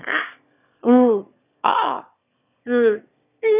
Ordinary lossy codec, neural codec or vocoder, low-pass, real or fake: none; autoencoder, 22.05 kHz, a latent of 192 numbers a frame, VITS, trained on one speaker; 3.6 kHz; fake